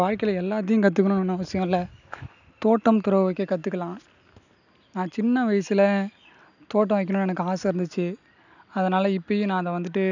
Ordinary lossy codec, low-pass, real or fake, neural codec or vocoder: none; 7.2 kHz; real; none